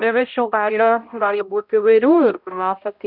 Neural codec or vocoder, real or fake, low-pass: codec, 16 kHz, 0.5 kbps, X-Codec, HuBERT features, trained on balanced general audio; fake; 5.4 kHz